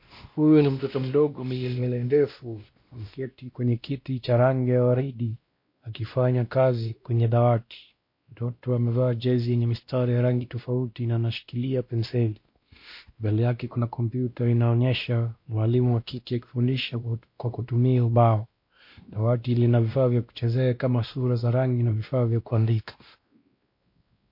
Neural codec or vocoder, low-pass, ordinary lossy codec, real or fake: codec, 16 kHz, 1 kbps, X-Codec, WavLM features, trained on Multilingual LibriSpeech; 5.4 kHz; MP3, 32 kbps; fake